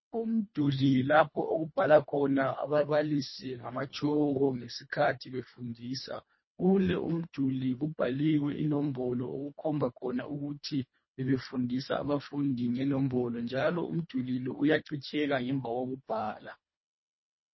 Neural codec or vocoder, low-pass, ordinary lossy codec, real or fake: codec, 24 kHz, 1.5 kbps, HILCodec; 7.2 kHz; MP3, 24 kbps; fake